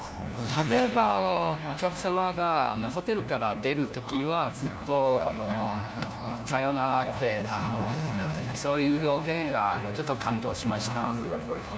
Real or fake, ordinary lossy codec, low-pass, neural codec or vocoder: fake; none; none; codec, 16 kHz, 1 kbps, FunCodec, trained on LibriTTS, 50 frames a second